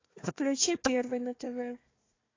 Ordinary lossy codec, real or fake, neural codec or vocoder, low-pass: AAC, 32 kbps; fake; codec, 16 kHz, 1 kbps, FunCodec, trained on Chinese and English, 50 frames a second; 7.2 kHz